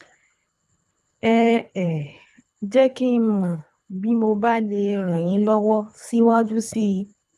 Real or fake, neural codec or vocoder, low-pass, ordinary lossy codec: fake; codec, 24 kHz, 3 kbps, HILCodec; none; none